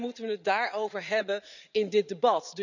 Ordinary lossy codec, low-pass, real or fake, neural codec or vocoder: none; 7.2 kHz; fake; vocoder, 44.1 kHz, 80 mel bands, Vocos